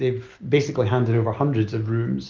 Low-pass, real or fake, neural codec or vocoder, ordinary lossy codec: 7.2 kHz; real; none; Opus, 32 kbps